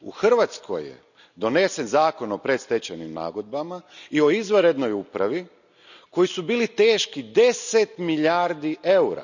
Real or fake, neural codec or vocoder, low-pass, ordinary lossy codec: real; none; 7.2 kHz; none